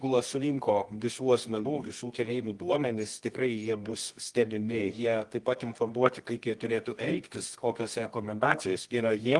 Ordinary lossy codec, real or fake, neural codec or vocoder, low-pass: Opus, 24 kbps; fake; codec, 24 kHz, 0.9 kbps, WavTokenizer, medium music audio release; 10.8 kHz